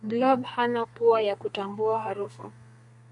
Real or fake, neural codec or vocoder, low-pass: fake; codec, 32 kHz, 1.9 kbps, SNAC; 10.8 kHz